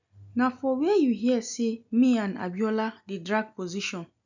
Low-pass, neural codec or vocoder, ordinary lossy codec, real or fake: 7.2 kHz; none; none; real